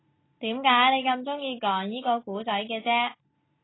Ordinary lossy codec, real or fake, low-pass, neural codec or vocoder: AAC, 16 kbps; real; 7.2 kHz; none